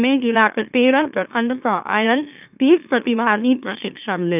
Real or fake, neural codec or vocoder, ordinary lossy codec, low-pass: fake; autoencoder, 44.1 kHz, a latent of 192 numbers a frame, MeloTTS; none; 3.6 kHz